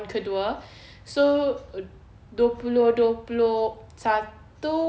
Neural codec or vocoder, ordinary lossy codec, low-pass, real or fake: none; none; none; real